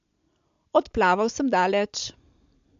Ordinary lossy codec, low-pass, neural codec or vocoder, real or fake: MP3, 64 kbps; 7.2 kHz; none; real